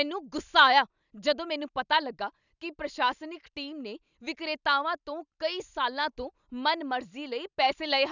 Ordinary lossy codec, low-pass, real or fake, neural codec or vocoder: none; 7.2 kHz; real; none